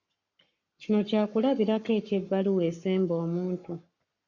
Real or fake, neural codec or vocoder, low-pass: fake; codec, 44.1 kHz, 7.8 kbps, Pupu-Codec; 7.2 kHz